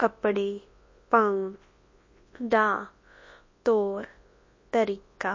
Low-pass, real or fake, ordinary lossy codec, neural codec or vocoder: 7.2 kHz; fake; MP3, 32 kbps; codec, 24 kHz, 0.9 kbps, WavTokenizer, large speech release